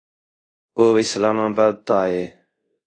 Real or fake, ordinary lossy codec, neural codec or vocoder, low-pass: fake; AAC, 32 kbps; codec, 24 kHz, 0.5 kbps, DualCodec; 9.9 kHz